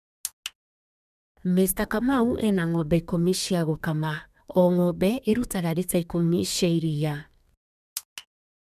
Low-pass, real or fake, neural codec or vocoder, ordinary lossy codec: 14.4 kHz; fake; codec, 32 kHz, 1.9 kbps, SNAC; none